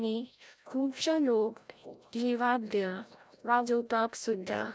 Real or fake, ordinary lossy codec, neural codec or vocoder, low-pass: fake; none; codec, 16 kHz, 0.5 kbps, FreqCodec, larger model; none